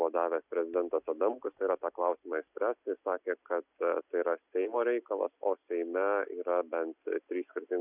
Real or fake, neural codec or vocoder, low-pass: real; none; 3.6 kHz